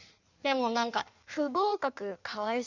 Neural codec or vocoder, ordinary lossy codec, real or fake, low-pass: codec, 16 kHz in and 24 kHz out, 1.1 kbps, FireRedTTS-2 codec; none; fake; 7.2 kHz